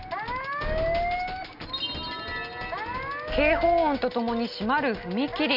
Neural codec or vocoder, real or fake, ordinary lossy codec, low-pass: none; real; none; 5.4 kHz